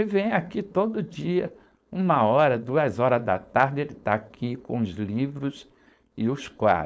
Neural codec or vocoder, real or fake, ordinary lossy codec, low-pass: codec, 16 kHz, 4.8 kbps, FACodec; fake; none; none